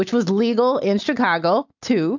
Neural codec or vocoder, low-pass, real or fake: none; 7.2 kHz; real